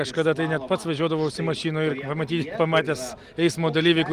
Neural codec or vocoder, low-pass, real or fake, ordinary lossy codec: none; 14.4 kHz; real; Opus, 24 kbps